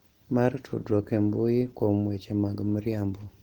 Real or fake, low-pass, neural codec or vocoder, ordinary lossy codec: real; 19.8 kHz; none; Opus, 16 kbps